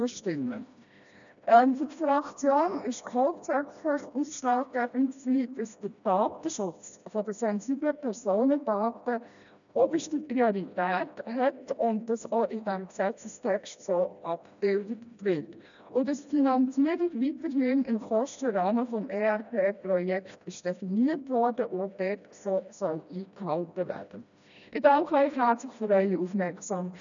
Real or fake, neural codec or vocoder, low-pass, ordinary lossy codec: fake; codec, 16 kHz, 1 kbps, FreqCodec, smaller model; 7.2 kHz; none